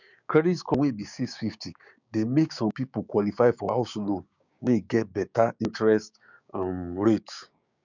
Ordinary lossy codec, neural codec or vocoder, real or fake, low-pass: none; codec, 16 kHz, 6 kbps, DAC; fake; 7.2 kHz